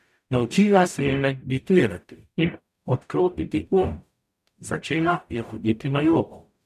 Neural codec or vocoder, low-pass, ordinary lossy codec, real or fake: codec, 44.1 kHz, 0.9 kbps, DAC; 14.4 kHz; none; fake